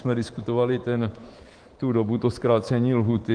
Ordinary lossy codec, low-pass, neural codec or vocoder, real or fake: Opus, 16 kbps; 9.9 kHz; codec, 24 kHz, 3.1 kbps, DualCodec; fake